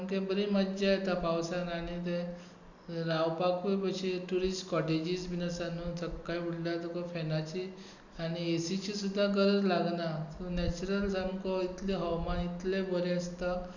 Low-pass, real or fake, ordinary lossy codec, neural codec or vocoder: 7.2 kHz; real; none; none